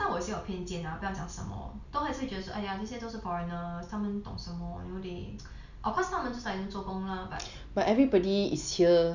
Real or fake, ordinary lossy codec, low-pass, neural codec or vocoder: real; none; 7.2 kHz; none